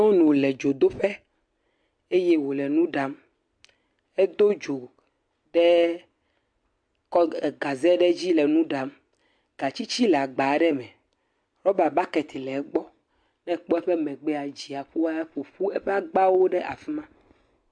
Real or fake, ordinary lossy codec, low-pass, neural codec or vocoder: real; MP3, 64 kbps; 9.9 kHz; none